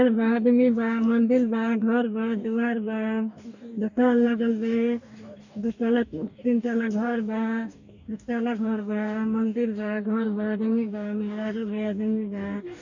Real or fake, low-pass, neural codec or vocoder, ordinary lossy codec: fake; 7.2 kHz; codec, 44.1 kHz, 2.6 kbps, DAC; none